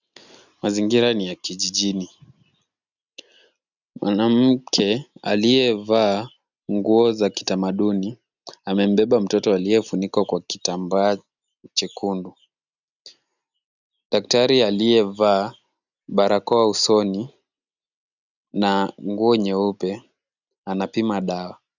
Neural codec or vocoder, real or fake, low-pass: none; real; 7.2 kHz